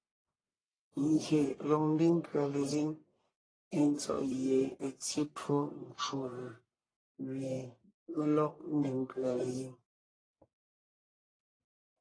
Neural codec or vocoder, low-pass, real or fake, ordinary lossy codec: codec, 44.1 kHz, 1.7 kbps, Pupu-Codec; 9.9 kHz; fake; AAC, 32 kbps